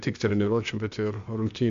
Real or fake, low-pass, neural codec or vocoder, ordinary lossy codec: fake; 7.2 kHz; codec, 16 kHz, 0.8 kbps, ZipCodec; MP3, 64 kbps